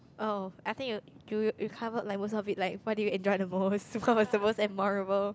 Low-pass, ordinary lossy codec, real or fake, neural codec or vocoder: none; none; real; none